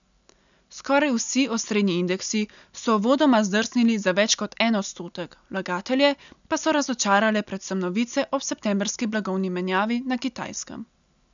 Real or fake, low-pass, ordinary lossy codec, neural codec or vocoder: real; 7.2 kHz; none; none